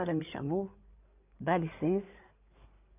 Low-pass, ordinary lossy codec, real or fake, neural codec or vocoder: 3.6 kHz; none; fake; codec, 16 kHz in and 24 kHz out, 2.2 kbps, FireRedTTS-2 codec